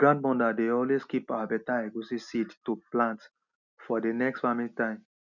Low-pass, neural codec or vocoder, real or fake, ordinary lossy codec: 7.2 kHz; none; real; none